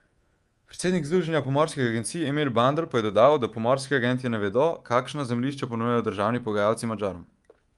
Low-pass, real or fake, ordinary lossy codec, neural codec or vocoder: 10.8 kHz; fake; Opus, 32 kbps; codec, 24 kHz, 3.1 kbps, DualCodec